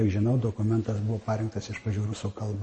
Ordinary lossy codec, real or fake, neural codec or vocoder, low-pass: MP3, 32 kbps; real; none; 10.8 kHz